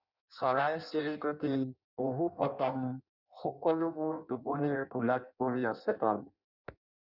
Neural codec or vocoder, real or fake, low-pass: codec, 16 kHz in and 24 kHz out, 0.6 kbps, FireRedTTS-2 codec; fake; 5.4 kHz